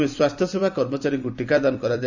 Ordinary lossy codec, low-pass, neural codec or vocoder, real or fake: none; 7.2 kHz; vocoder, 44.1 kHz, 128 mel bands every 256 samples, BigVGAN v2; fake